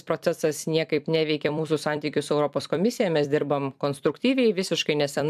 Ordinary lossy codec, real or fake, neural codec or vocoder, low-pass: AAC, 96 kbps; fake; vocoder, 44.1 kHz, 128 mel bands every 256 samples, BigVGAN v2; 14.4 kHz